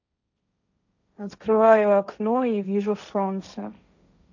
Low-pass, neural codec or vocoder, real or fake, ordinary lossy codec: 7.2 kHz; codec, 16 kHz, 1.1 kbps, Voila-Tokenizer; fake; none